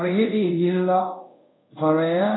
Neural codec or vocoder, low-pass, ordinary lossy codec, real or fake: codec, 24 kHz, 0.5 kbps, DualCodec; 7.2 kHz; AAC, 16 kbps; fake